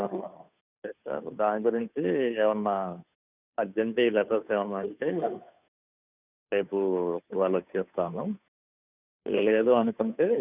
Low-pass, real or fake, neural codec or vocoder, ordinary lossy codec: 3.6 kHz; fake; codec, 16 kHz, 2 kbps, FunCodec, trained on Chinese and English, 25 frames a second; none